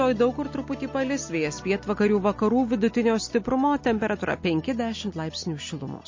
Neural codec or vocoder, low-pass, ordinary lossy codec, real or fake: none; 7.2 kHz; MP3, 32 kbps; real